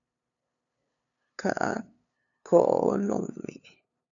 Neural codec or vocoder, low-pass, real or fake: codec, 16 kHz, 2 kbps, FunCodec, trained on LibriTTS, 25 frames a second; 7.2 kHz; fake